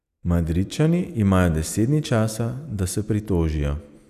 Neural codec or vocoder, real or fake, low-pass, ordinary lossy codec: none; real; 14.4 kHz; none